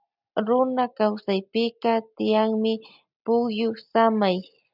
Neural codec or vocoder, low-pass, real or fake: none; 5.4 kHz; real